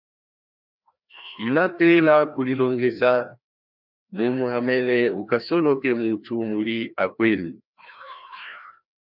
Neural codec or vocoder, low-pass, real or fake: codec, 16 kHz, 1 kbps, FreqCodec, larger model; 5.4 kHz; fake